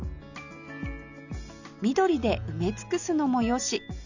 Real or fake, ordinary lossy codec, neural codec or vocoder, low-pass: real; none; none; 7.2 kHz